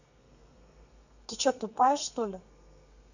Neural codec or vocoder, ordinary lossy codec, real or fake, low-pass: codec, 32 kHz, 1.9 kbps, SNAC; none; fake; 7.2 kHz